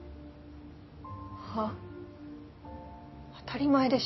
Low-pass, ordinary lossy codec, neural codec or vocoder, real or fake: 7.2 kHz; MP3, 24 kbps; none; real